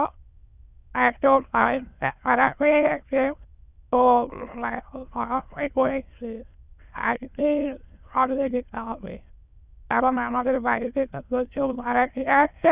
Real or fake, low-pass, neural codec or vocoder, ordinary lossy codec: fake; 3.6 kHz; autoencoder, 22.05 kHz, a latent of 192 numbers a frame, VITS, trained on many speakers; Opus, 64 kbps